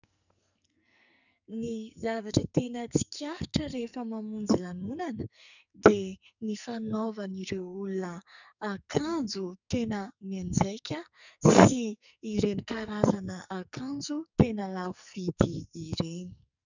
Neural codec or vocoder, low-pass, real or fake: codec, 44.1 kHz, 2.6 kbps, SNAC; 7.2 kHz; fake